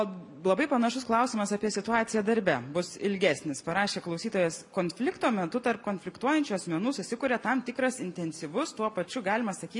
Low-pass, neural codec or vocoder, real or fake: 10.8 kHz; none; real